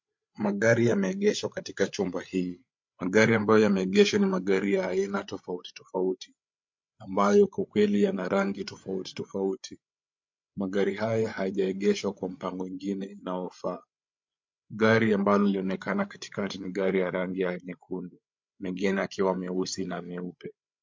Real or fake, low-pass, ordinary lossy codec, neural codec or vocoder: fake; 7.2 kHz; MP3, 48 kbps; codec, 16 kHz, 8 kbps, FreqCodec, larger model